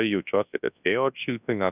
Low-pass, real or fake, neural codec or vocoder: 3.6 kHz; fake; codec, 24 kHz, 0.9 kbps, WavTokenizer, large speech release